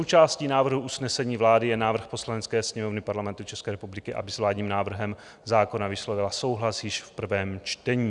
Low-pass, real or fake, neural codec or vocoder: 10.8 kHz; real; none